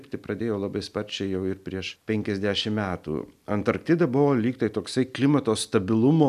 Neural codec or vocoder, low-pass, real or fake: none; 14.4 kHz; real